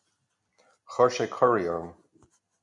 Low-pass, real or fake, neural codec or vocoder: 10.8 kHz; real; none